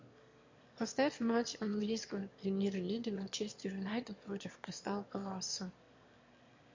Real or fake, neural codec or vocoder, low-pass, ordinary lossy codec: fake; autoencoder, 22.05 kHz, a latent of 192 numbers a frame, VITS, trained on one speaker; 7.2 kHz; MP3, 48 kbps